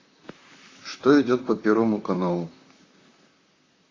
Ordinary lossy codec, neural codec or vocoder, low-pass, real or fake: AAC, 32 kbps; vocoder, 44.1 kHz, 128 mel bands, Pupu-Vocoder; 7.2 kHz; fake